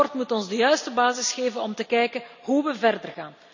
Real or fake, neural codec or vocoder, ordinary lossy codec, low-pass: real; none; none; 7.2 kHz